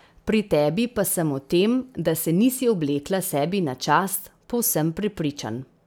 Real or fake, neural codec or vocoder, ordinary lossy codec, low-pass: real; none; none; none